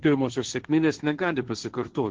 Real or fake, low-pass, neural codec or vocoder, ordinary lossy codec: fake; 7.2 kHz; codec, 16 kHz, 1.1 kbps, Voila-Tokenizer; Opus, 16 kbps